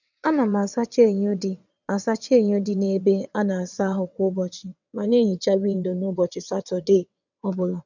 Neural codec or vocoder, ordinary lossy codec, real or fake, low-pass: vocoder, 22.05 kHz, 80 mel bands, WaveNeXt; none; fake; 7.2 kHz